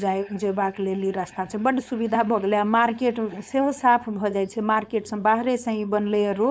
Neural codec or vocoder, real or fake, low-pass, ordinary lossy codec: codec, 16 kHz, 4.8 kbps, FACodec; fake; none; none